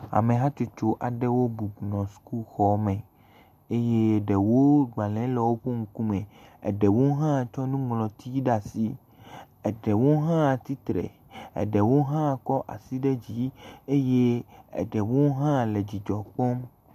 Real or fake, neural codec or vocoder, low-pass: real; none; 14.4 kHz